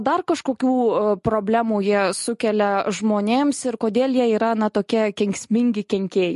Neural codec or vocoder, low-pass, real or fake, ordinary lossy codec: none; 14.4 kHz; real; MP3, 48 kbps